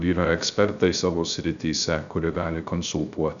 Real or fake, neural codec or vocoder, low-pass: fake; codec, 16 kHz, 0.3 kbps, FocalCodec; 7.2 kHz